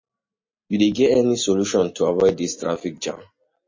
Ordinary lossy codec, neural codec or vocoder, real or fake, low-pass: MP3, 32 kbps; none; real; 7.2 kHz